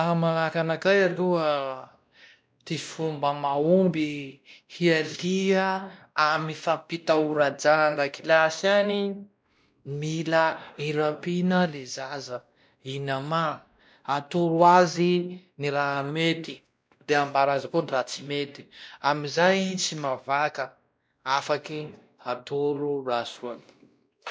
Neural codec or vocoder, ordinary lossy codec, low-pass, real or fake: codec, 16 kHz, 1 kbps, X-Codec, WavLM features, trained on Multilingual LibriSpeech; none; none; fake